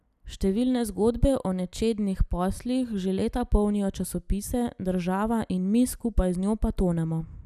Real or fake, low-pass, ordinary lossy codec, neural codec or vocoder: real; 14.4 kHz; none; none